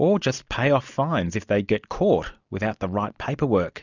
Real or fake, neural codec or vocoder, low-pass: real; none; 7.2 kHz